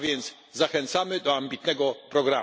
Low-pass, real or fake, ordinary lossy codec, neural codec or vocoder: none; real; none; none